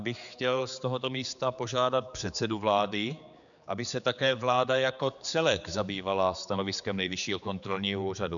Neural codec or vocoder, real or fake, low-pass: codec, 16 kHz, 4 kbps, X-Codec, HuBERT features, trained on general audio; fake; 7.2 kHz